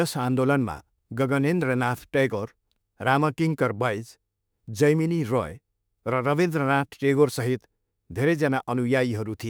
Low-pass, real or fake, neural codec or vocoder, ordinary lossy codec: none; fake; autoencoder, 48 kHz, 32 numbers a frame, DAC-VAE, trained on Japanese speech; none